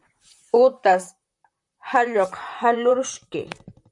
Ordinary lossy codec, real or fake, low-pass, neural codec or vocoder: MP3, 96 kbps; fake; 10.8 kHz; vocoder, 44.1 kHz, 128 mel bands, Pupu-Vocoder